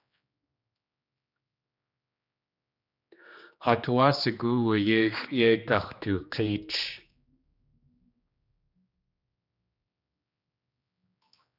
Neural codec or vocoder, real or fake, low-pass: codec, 16 kHz, 2 kbps, X-Codec, HuBERT features, trained on general audio; fake; 5.4 kHz